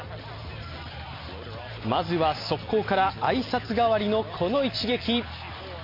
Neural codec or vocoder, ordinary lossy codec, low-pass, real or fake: none; MP3, 32 kbps; 5.4 kHz; real